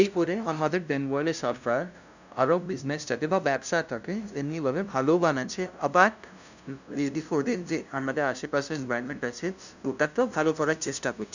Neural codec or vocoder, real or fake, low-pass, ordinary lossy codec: codec, 16 kHz, 0.5 kbps, FunCodec, trained on LibriTTS, 25 frames a second; fake; 7.2 kHz; none